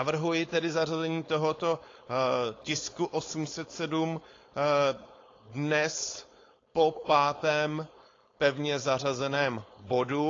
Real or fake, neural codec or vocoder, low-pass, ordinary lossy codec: fake; codec, 16 kHz, 4.8 kbps, FACodec; 7.2 kHz; AAC, 32 kbps